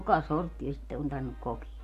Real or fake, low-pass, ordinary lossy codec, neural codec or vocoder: real; 14.4 kHz; AAC, 64 kbps; none